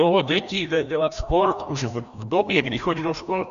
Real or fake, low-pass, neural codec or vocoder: fake; 7.2 kHz; codec, 16 kHz, 1 kbps, FreqCodec, larger model